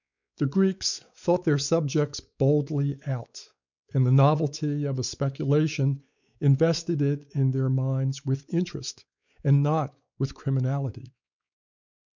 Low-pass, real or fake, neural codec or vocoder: 7.2 kHz; fake; codec, 16 kHz, 4 kbps, X-Codec, WavLM features, trained on Multilingual LibriSpeech